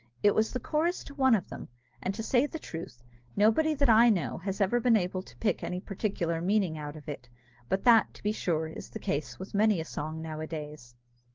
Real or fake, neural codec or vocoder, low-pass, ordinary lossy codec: real; none; 7.2 kHz; Opus, 16 kbps